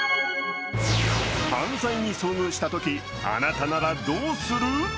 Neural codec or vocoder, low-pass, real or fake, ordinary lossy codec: none; none; real; none